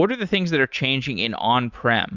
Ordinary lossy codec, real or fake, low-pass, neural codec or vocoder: Opus, 64 kbps; real; 7.2 kHz; none